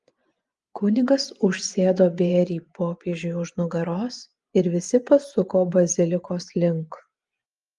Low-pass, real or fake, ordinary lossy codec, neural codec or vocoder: 10.8 kHz; fake; Opus, 32 kbps; vocoder, 24 kHz, 100 mel bands, Vocos